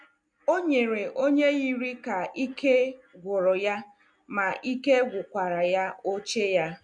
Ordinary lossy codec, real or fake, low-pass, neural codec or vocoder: MP3, 64 kbps; real; 9.9 kHz; none